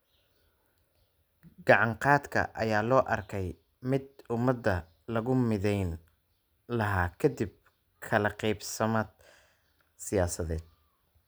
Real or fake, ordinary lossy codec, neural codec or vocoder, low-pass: real; none; none; none